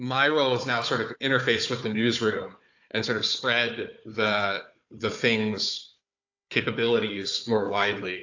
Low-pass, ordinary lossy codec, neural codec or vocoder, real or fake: 7.2 kHz; AAC, 48 kbps; codec, 16 kHz, 4 kbps, FunCodec, trained on Chinese and English, 50 frames a second; fake